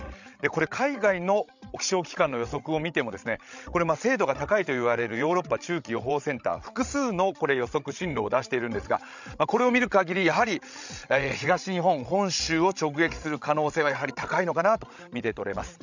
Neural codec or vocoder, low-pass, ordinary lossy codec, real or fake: codec, 16 kHz, 16 kbps, FreqCodec, larger model; 7.2 kHz; none; fake